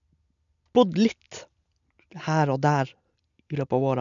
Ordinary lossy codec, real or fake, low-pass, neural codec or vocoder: none; real; 7.2 kHz; none